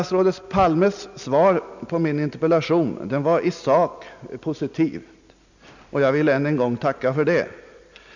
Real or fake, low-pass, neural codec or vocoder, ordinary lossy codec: real; 7.2 kHz; none; none